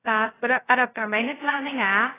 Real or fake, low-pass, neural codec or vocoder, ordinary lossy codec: fake; 3.6 kHz; codec, 16 kHz, 0.2 kbps, FocalCodec; AAC, 16 kbps